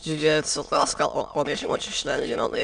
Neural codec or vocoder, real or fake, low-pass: autoencoder, 22.05 kHz, a latent of 192 numbers a frame, VITS, trained on many speakers; fake; 9.9 kHz